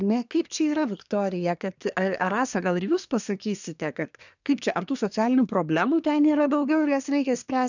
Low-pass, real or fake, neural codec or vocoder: 7.2 kHz; fake; codec, 24 kHz, 1 kbps, SNAC